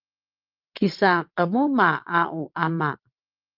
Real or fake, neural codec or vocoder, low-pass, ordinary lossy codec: fake; vocoder, 22.05 kHz, 80 mel bands, WaveNeXt; 5.4 kHz; Opus, 32 kbps